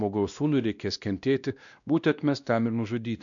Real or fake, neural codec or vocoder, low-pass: fake; codec, 16 kHz, 1 kbps, X-Codec, WavLM features, trained on Multilingual LibriSpeech; 7.2 kHz